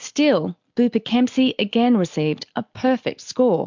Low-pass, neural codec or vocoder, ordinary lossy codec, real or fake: 7.2 kHz; none; MP3, 64 kbps; real